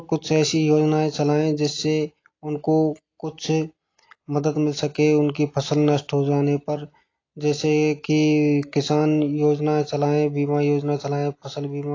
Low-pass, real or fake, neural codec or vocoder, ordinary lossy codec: 7.2 kHz; real; none; AAC, 32 kbps